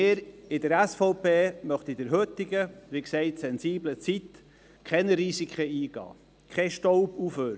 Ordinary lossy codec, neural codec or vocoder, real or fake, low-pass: none; none; real; none